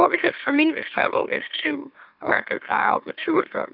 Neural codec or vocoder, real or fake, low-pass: autoencoder, 44.1 kHz, a latent of 192 numbers a frame, MeloTTS; fake; 5.4 kHz